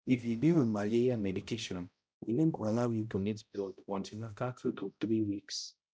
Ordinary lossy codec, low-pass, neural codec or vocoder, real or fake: none; none; codec, 16 kHz, 0.5 kbps, X-Codec, HuBERT features, trained on balanced general audio; fake